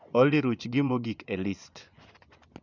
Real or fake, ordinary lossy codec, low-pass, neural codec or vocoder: fake; none; 7.2 kHz; vocoder, 24 kHz, 100 mel bands, Vocos